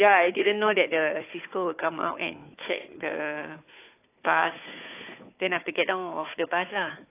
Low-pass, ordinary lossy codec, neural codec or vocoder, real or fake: 3.6 kHz; AAC, 24 kbps; codec, 16 kHz, 4 kbps, FunCodec, trained on Chinese and English, 50 frames a second; fake